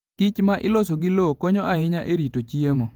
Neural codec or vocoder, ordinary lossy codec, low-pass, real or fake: vocoder, 48 kHz, 128 mel bands, Vocos; Opus, 32 kbps; 19.8 kHz; fake